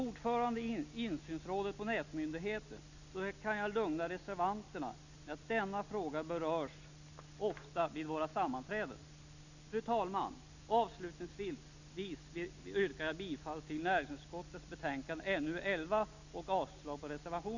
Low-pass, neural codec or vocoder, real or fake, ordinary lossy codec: 7.2 kHz; none; real; none